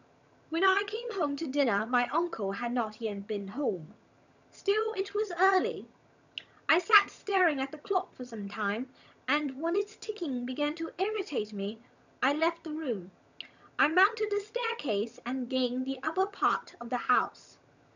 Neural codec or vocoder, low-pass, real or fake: vocoder, 22.05 kHz, 80 mel bands, HiFi-GAN; 7.2 kHz; fake